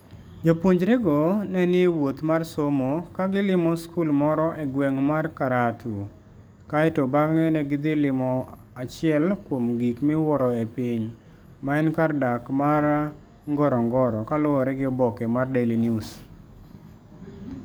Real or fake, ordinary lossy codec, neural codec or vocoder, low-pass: fake; none; codec, 44.1 kHz, 7.8 kbps, DAC; none